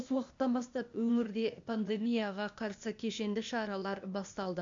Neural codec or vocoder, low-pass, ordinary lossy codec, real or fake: codec, 16 kHz, 0.8 kbps, ZipCodec; 7.2 kHz; MP3, 64 kbps; fake